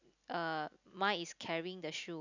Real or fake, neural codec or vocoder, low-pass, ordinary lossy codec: real; none; 7.2 kHz; none